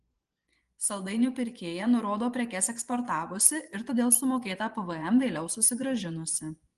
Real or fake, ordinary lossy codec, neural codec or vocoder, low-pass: fake; Opus, 24 kbps; vocoder, 24 kHz, 100 mel bands, Vocos; 10.8 kHz